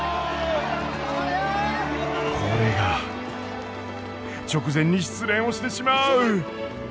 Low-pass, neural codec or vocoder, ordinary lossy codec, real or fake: none; none; none; real